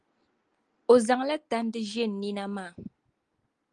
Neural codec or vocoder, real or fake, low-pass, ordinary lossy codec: none; real; 9.9 kHz; Opus, 24 kbps